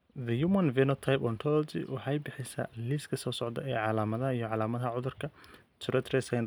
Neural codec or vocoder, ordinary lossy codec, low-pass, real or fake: none; none; none; real